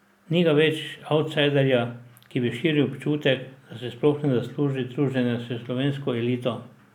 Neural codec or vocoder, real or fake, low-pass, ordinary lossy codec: none; real; 19.8 kHz; none